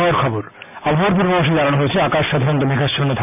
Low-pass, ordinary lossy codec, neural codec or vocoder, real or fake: 3.6 kHz; MP3, 32 kbps; none; real